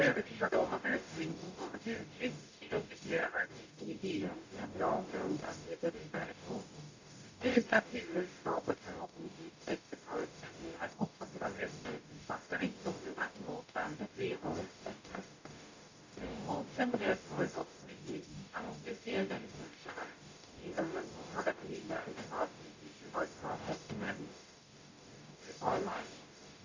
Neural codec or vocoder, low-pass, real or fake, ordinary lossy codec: codec, 44.1 kHz, 0.9 kbps, DAC; 7.2 kHz; fake; AAC, 48 kbps